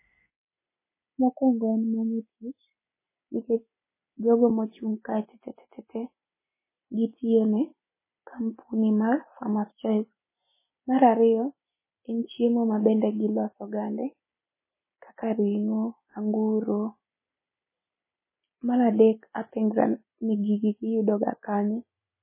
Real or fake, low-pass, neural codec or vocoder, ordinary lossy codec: real; 3.6 kHz; none; MP3, 16 kbps